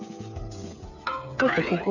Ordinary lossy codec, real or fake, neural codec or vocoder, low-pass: none; fake; codec, 16 kHz, 8 kbps, FreqCodec, smaller model; 7.2 kHz